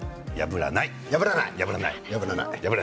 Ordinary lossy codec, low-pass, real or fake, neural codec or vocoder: none; none; real; none